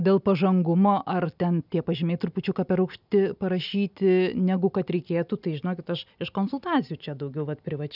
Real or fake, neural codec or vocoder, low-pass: real; none; 5.4 kHz